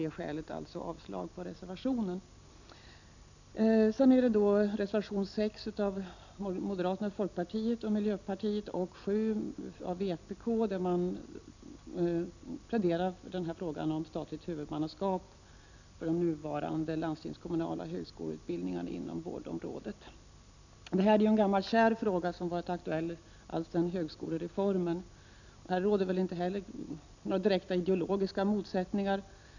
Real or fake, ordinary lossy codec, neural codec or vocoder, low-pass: real; none; none; 7.2 kHz